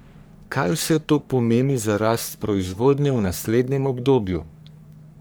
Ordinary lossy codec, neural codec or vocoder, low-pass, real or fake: none; codec, 44.1 kHz, 3.4 kbps, Pupu-Codec; none; fake